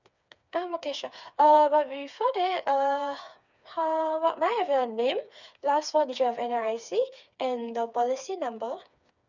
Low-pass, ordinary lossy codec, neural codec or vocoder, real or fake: 7.2 kHz; none; codec, 16 kHz, 4 kbps, FreqCodec, smaller model; fake